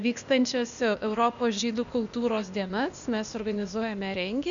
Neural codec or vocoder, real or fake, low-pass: codec, 16 kHz, 0.8 kbps, ZipCodec; fake; 7.2 kHz